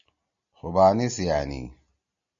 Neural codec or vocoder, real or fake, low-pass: none; real; 7.2 kHz